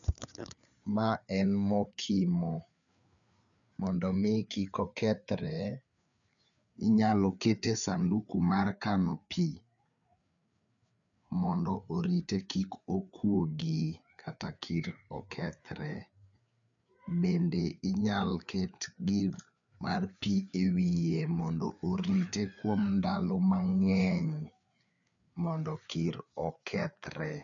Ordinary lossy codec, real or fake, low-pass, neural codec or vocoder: none; fake; 7.2 kHz; codec, 16 kHz, 4 kbps, FreqCodec, larger model